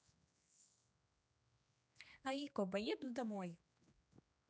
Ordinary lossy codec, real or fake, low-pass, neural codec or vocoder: none; fake; none; codec, 16 kHz, 2 kbps, X-Codec, HuBERT features, trained on general audio